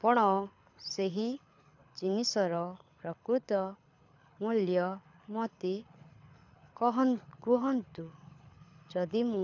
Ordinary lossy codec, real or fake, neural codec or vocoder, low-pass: none; fake; codec, 24 kHz, 6 kbps, HILCodec; 7.2 kHz